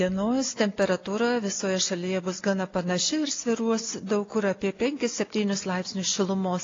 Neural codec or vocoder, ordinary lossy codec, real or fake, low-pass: none; AAC, 32 kbps; real; 7.2 kHz